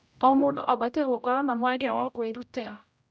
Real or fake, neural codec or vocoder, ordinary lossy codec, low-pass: fake; codec, 16 kHz, 0.5 kbps, X-Codec, HuBERT features, trained on general audio; none; none